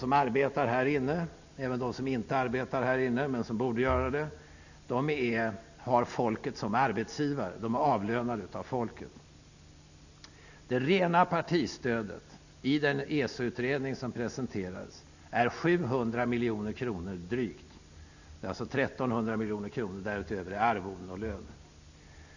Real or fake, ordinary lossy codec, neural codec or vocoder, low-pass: real; none; none; 7.2 kHz